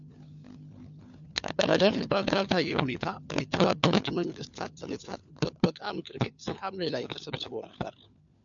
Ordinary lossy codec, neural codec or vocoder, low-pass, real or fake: MP3, 96 kbps; codec, 16 kHz, 4 kbps, FunCodec, trained on LibriTTS, 50 frames a second; 7.2 kHz; fake